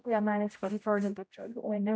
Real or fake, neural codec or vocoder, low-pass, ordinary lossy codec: fake; codec, 16 kHz, 0.5 kbps, X-Codec, HuBERT features, trained on general audio; none; none